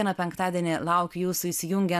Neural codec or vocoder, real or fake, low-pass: none; real; 14.4 kHz